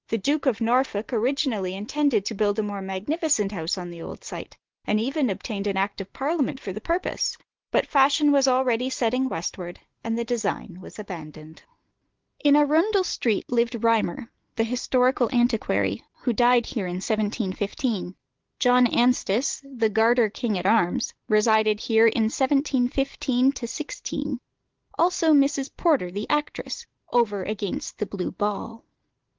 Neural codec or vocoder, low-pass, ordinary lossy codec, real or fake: none; 7.2 kHz; Opus, 16 kbps; real